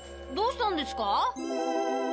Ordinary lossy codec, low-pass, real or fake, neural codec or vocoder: none; none; real; none